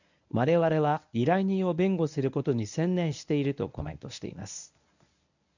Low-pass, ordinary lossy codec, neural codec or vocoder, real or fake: 7.2 kHz; none; codec, 24 kHz, 0.9 kbps, WavTokenizer, medium speech release version 1; fake